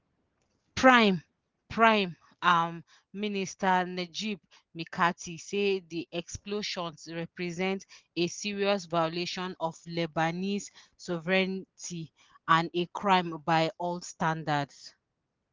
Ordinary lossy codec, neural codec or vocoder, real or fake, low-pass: Opus, 16 kbps; none; real; 7.2 kHz